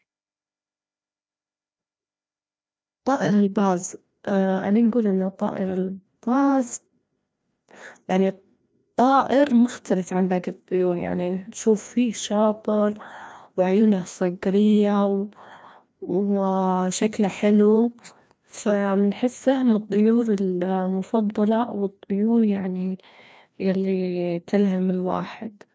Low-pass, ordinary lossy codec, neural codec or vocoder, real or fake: none; none; codec, 16 kHz, 1 kbps, FreqCodec, larger model; fake